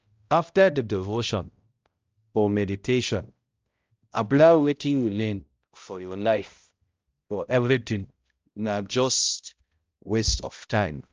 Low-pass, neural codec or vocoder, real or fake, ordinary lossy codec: 7.2 kHz; codec, 16 kHz, 0.5 kbps, X-Codec, HuBERT features, trained on balanced general audio; fake; Opus, 24 kbps